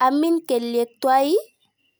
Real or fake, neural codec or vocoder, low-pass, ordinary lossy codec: real; none; none; none